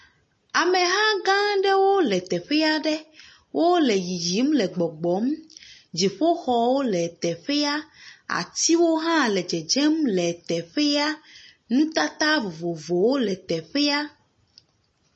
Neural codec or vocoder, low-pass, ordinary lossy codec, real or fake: none; 10.8 kHz; MP3, 32 kbps; real